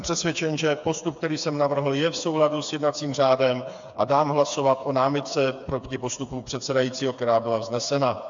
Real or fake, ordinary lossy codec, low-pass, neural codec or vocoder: fake; AAC, 64 kbps; 7.2 kHz; codec, 16 kHz, 4 kbps, FreqCodec, smaller model